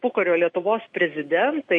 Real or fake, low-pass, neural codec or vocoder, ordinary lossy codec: real; 10.8 kHz; none; MP3, 48 kbps